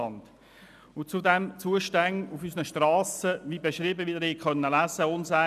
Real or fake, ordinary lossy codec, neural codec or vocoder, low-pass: real; none; none; 14.4 kHz